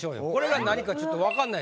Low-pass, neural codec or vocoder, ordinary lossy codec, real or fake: none; none; none; real